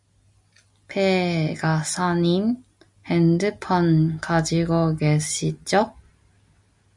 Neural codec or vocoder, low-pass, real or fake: none; 10.8 kHz; real